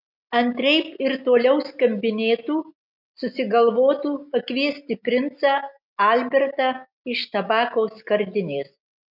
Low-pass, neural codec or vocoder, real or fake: 5.4 kHz; none; real